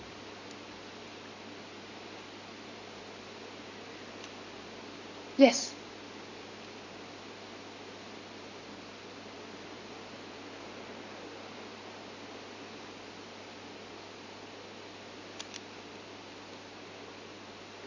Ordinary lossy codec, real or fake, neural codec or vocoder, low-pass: Opus, 64 kbps; real; none; 7.2 kHz